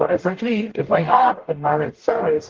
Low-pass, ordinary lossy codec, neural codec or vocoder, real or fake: 7.2 kHz; Opus, 16 kbps; codec, 44.1 kHz, 0.9 kbps, DAC; fake